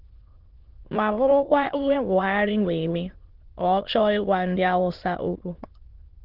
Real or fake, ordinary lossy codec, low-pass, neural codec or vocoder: fake; Opus, 16 kbps; 5.4 kHz; autoencoder, 22.05 kHz, a latent of 192 numbers a frame, VITS, trained on many speakers